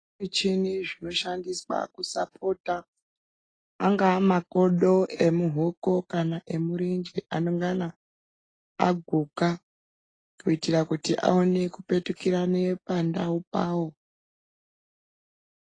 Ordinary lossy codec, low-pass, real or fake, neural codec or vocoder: AAC, 32 kbps; 9.9 kHz; real; none